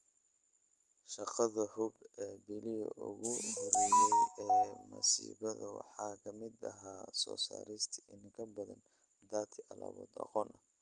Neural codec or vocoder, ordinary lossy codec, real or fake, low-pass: none; Opus, 24 kbps; real; 10.8 kHz